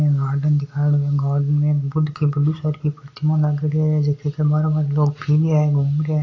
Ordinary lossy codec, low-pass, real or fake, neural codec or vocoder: AAC, 32 kbps; 7.2 kHz; real; none